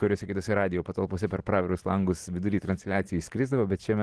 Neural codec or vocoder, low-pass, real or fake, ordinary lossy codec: none; 10.8 kHz; real; Opus, 16 kbps